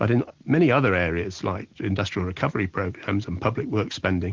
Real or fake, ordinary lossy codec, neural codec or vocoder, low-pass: real; Opus, 16 kbps; none; 7.2 kHz